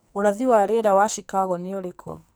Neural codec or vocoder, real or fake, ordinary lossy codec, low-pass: codec, 44.1 kHz, 2.6 kbps, SNAC; fake; none; none